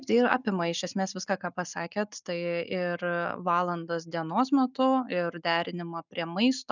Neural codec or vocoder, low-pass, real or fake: none; 7.2 kHz; real